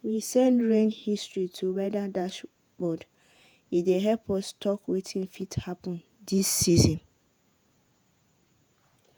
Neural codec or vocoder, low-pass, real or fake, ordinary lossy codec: vocoder, 48 kHz, 128 mel bands, Vocos; none; fake; none